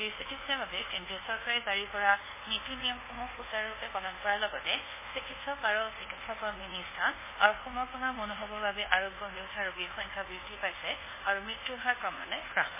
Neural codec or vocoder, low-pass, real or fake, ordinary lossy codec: codec, 24 kHz, 1.2 kbps, DualCodec; 3.6 kHz; fake; MP3, 16 kbps